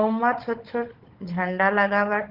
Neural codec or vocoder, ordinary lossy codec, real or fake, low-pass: codec, 16 kHz, 16 kbps, FunCodec, trained on Chinese and English, 50 frames a second; Opus, 16 kbps; fake; 5.4 kHz